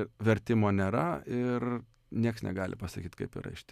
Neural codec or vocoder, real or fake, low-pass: none; real; 14.4 kHz